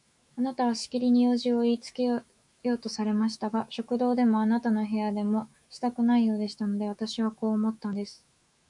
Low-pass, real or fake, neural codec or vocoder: 10.8 kHz; fake; autoencoder, 48 kHz, 128 numbers a frame, DAC-VAE, trained on Japanese speech